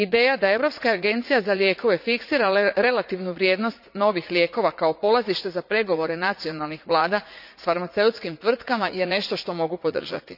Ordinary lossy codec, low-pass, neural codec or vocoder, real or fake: none; 5.4 kHz; vocoder, 44.1 kHz, 80 mel bands, Vocos; fake